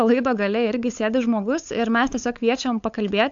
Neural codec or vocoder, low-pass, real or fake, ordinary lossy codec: codec, 16 kHz, 8 kbps, FunCodec, trained on LibriTTS, 25 frames a second; 7.2 kHz; fake; AAC, 64 kbps